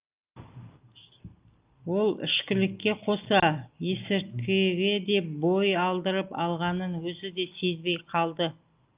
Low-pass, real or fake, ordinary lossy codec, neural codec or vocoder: 3.6 kHz; real; Opus, 32 kbps; none